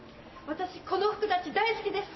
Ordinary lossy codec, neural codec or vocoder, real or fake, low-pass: MP3, 24 kbps; none; real; 7.2 kHz